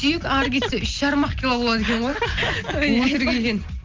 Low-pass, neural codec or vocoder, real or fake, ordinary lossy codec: 7.2 kHz; none; real; Opus, 32 kbps